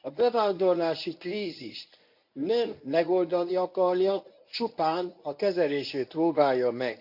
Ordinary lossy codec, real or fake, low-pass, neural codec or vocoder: none; fake; 5.4 kHz; codec, 24 kHz, 0.9 kbps, WavTokenizer, medium speech release version 1